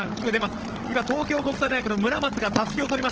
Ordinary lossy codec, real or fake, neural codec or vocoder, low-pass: Opus, 16 kbps; fake; codec, 16 kHz, 16 kbps, FunCodec, trained on Chinese and English, 50 frames a second; 7.2 kHz